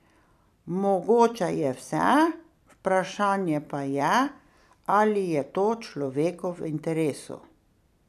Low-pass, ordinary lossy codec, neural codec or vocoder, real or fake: 14.4 kHz; none; vocoder, 44.1 kHz, 128 mel bands every 256 samples, BigVGAN v2; fake